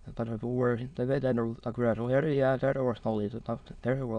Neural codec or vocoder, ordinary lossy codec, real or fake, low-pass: autoencoder, 22.05 kHz, a latent of 192 numbers a frame, VITS, trained on many speakers; none; fake; 9.9 kHz